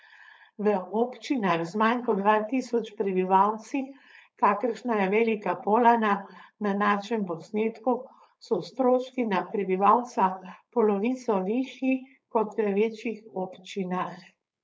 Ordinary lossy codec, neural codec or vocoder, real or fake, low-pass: none; codec, 16 kHz, 4.8 kbps, FACodec; fake; none